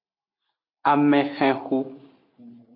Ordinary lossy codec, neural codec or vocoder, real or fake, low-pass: MP3, 32 kbps; none; real; 5.4 kHz